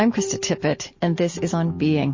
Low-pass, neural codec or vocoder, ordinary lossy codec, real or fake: 7.2 kHz; none; MP3, 32 kbps; real